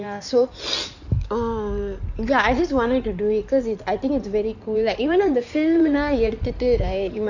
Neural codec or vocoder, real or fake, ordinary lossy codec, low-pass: codec, 16 kHz in and 24 kHz out, 2.2 kbps, FireRedTTS-2 codec; fake; none; 7.2 kHz